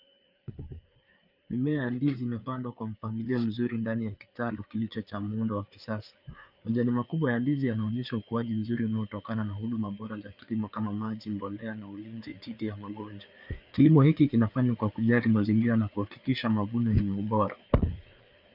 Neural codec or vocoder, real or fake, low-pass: codec, 16 kHz, 4 kbps, FreqCodec, larger model; fake; 5.4 kHz